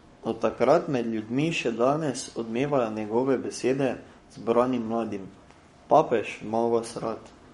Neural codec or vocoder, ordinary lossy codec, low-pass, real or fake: codec, 44.1 kHz, 7.8 kbps, Pupu-Codec; MP3, 48 kbps; 19.8 kHz; fake